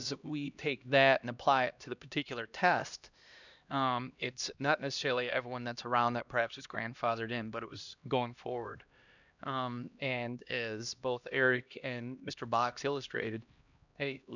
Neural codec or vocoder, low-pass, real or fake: codec, 16 kHz, 1 kbps, X-Codec, HuBERT features, trained on LibriSpeech; 7.2 kHz; fake